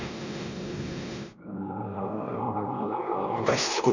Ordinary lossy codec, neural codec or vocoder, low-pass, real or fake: none; codec, 16 kHz, 1 kbps, X-Codec, WavLM features, trained on Multilingual LibriSpeech; 7.2 kHz; fake